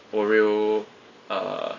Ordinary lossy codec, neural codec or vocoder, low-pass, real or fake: AAC, 32 kbps; none; 7.2 kHz; real